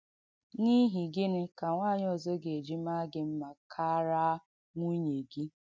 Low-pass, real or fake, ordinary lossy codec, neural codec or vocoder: none; real; none; none